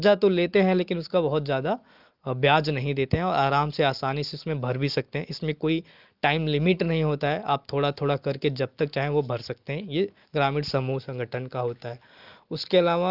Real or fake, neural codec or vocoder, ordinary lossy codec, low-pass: real; none; Opus, 32 kbps; 5.4 kHz